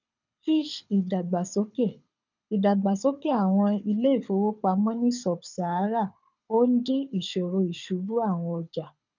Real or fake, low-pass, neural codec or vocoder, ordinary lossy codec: fake; 7.2 kHz; codec, 24 kHz, 6 kbps, HILCodec; none